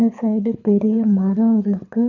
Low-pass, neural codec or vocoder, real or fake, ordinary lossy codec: 7.2 kHz; codec, 24 kHz, 6 kbps, HILCodec; fake; none